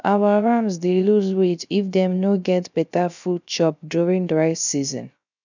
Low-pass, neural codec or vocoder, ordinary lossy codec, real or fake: 7.2 kHz; codec, 16 kHz, 0.3 kbps, FocalCodec; none; fake